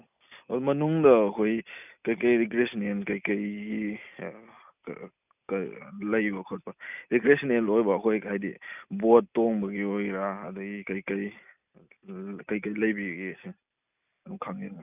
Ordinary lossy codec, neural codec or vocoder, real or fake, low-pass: none; none; real; 3.6 kHz